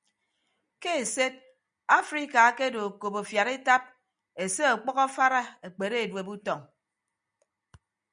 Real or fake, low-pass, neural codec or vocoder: real; 9.9 kHz; none